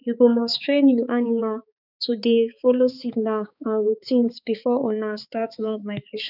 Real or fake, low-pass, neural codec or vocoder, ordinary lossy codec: fake; 5.4 kHz; codec, 16 kHz, 4 kbps, X-Codec, HuBERT features, trained on balanced general audio; none